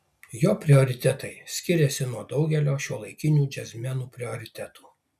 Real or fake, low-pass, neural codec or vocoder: real; 14.4 kHz; none